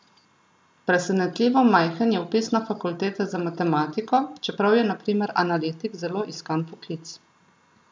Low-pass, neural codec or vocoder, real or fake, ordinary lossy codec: none; none; real; none